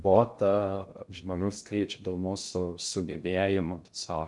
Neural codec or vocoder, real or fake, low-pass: codec, 16 kHz in and 24 kHz out, 0.6 kbps, FocalCodec, streaming, 2048 codes; fake; 10.8 kHz